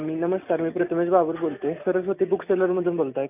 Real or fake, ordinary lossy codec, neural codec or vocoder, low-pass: real; none; none; 3.6 kHz